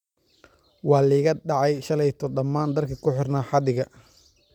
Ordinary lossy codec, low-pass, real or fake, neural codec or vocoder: none; 19.8 kHz; real; none